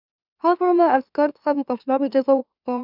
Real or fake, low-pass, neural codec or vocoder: fake; 5.4 kHz; autoencoder, 44.1 kHz, a latent of 192 numbers a frame, MeloTTS